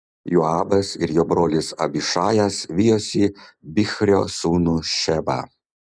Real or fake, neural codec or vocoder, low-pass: real; none; 9.9 kHz